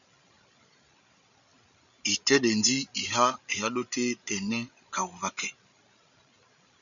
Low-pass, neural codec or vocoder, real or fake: 7.2 kHz; codec, 16 kHz, 16 kbps, FreqCodec, larger model; fake